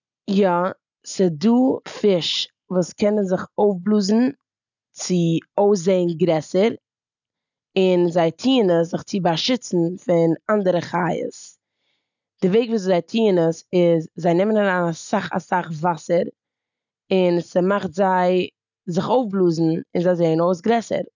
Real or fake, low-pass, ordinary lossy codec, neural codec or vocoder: real; 7.2 kHz; none; none